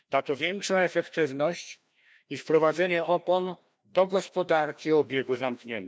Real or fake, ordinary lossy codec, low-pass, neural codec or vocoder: fake; none; none; codec, 16 kHz, 1 kbps, FreqCodec, larger model